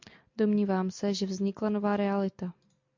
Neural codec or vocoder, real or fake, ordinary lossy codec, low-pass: none; real; MP3, 48 kbps; 7.2 kHz